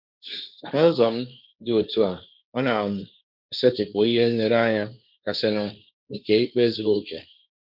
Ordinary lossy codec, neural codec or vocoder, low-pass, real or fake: none; codec, 16 kHz, 1.1 kbps, Voila-Tokenizer; 5.4 kHz; fake